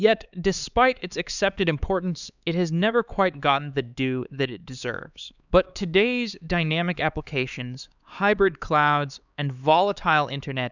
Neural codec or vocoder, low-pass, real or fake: codec, 16 kHz, 4 kbps, X-Codec, HuBERT features, trained on LibriSpeech; 7.2 kHz; fake